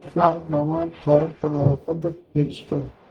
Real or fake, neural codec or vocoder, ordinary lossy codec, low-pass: fake; codec, 44.1 kHz, 0.9 kbps, DAC; Opus, 32 kbps; 19.8 kHz